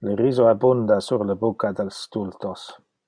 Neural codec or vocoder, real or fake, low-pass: none; real; 9.9 kHz